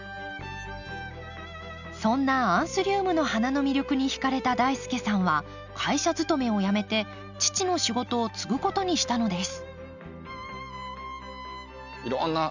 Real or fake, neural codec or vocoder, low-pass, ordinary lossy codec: real; none; 7.2 kHz; none